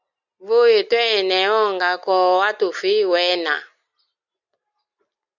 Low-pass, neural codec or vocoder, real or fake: 7.2 kHz; none; real